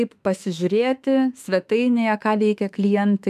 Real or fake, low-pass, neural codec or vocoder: fake; 14.4 kHz; autoencoder, 48 kHz, 32 numbers a frame, DAC-VAE, trained on Japanese speech